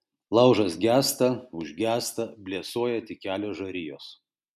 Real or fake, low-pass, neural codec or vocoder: real; 14.4 kHz; none